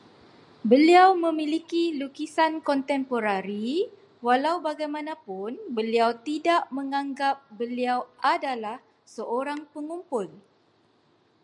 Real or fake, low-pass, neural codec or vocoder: real; 10.8 kHz; none